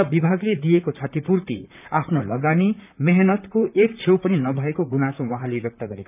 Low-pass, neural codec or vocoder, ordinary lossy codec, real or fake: 3.6 kHz; vocoder, 44.1 kHz, 128 mel bands, Pupu-Vocoder; none; fake